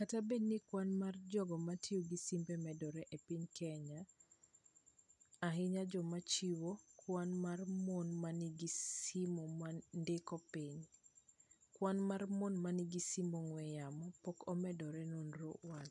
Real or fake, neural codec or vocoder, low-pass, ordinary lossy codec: real; none; 10.8 kHz; none